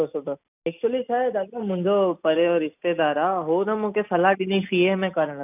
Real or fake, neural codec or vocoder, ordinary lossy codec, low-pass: real; none; none; 3.6 kHz